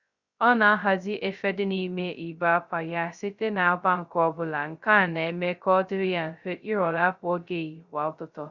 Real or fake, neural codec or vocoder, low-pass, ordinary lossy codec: fake; codec, 16 kHz, 0.2 kbps, FocalCodec; 7.2 kHz; Opus, 64 kbps